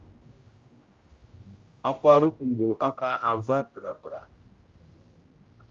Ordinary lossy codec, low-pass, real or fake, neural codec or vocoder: Opus, 32 kbps; 7.2 kHz; fake; codec, 16 kHz, 0.5 kbps, X-Codec, HuBERT features, trained on general audio